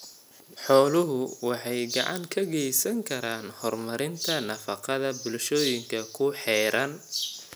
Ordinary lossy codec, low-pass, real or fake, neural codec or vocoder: none; none; real; none